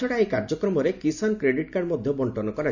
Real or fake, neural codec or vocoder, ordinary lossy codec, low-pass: real; none; none; 7.2 kHz